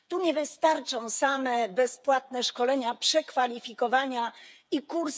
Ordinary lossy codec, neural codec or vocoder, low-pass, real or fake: none; codec, 16 kHz, 8 kbps, FreqCodec, smaller model; none; fake